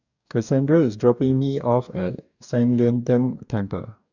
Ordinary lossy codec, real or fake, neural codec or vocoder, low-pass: none; fake; codec, 44.1 kHz, 2.6 kbps, DAC; 7.2 kHz